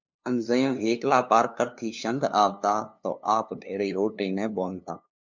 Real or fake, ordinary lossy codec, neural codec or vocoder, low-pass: fake; MP3, 64 kbps; codec, 16 kHz, 2 kbps, FunCodec, trained on LibriTTS, 25 frames a second; 7.2 kHz